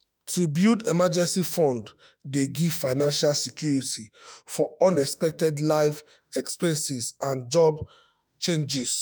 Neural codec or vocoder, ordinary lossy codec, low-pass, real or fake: autoencoder, 48 kHz, 32 numbers a frame, DAC-VAE, trained on Japanese speech; none; none; fake